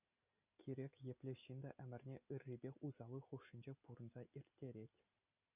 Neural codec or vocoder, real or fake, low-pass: none; real; 3.6 kHz